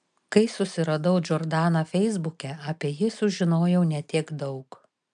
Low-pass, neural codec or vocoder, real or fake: 9.9 kHz; none; real